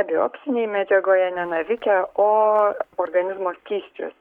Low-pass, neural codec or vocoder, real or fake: 19.8 kHz; codec, 44.1 kHz, 7.8 kbps, Pupu-Codec; fake